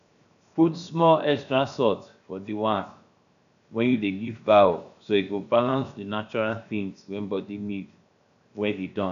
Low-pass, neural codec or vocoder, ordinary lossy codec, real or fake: 7.2 kHz; codec, 16 kHz, 0.7 kbps, FocalCodec; none; fake